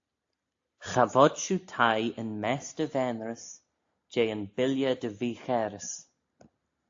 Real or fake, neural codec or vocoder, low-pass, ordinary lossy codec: real; none; 7.2 kHz; AAC, 48 kbps